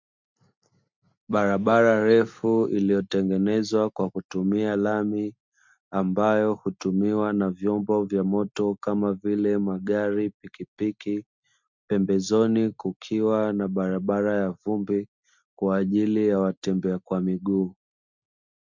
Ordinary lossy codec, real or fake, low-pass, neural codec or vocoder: MP3, 64 kbps; real; 7.2 kHz; none